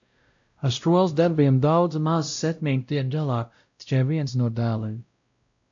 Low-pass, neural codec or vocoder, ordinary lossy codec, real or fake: 7.2 kHz; codec, 16 kHz, 0.5 kbps, X-Codec, WavLM features, trained on Multilingual LibriSpeech; AAC, 64 kbps; fake